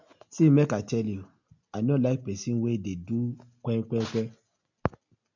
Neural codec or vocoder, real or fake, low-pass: none; real; 7.2 kHz